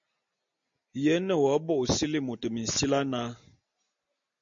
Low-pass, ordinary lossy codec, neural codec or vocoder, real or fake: 7.2 kHz; MP3, 48 kbps; none; real